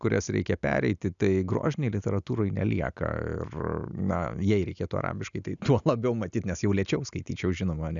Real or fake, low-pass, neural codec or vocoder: real; 7.2 kHz; none